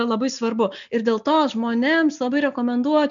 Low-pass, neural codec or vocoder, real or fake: 7.2 kHz; none; real